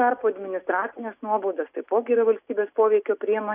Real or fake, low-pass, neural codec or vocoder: real; 3.6 kHz; none